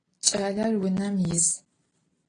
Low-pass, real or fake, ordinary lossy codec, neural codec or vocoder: 9.9 kHz; real; AAC, 32 kbps; none